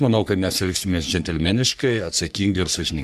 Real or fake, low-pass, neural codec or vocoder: fake; 14.4 kHz; codec, 44.1 kHz, 3.4 kbps, Pupu-Codec